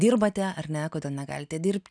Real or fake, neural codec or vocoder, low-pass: real; none; 9.9 kHz